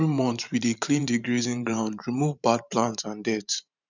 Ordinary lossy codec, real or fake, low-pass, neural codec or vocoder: none; fake; 7.2 kHz; vocoder, 44.1 kHz, 128 mel bands every 512 samples, BigVGAN v2